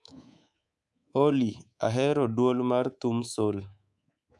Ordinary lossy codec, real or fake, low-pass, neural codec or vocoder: none; fake; none; codec, 24 kHz, 3.1 kbps, DualCodec